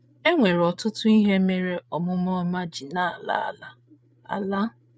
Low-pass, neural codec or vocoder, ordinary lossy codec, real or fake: none; codec, 16 kHz, 8 kbps, FreqCodec, larger model; none; fake